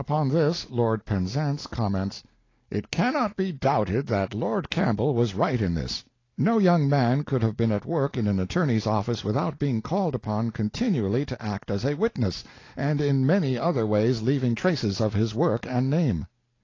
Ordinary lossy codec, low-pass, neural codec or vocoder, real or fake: AAC, 32 kbps; 7.2 kHz; none; real